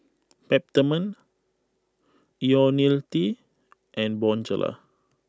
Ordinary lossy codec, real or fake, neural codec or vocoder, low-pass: none; real; none; none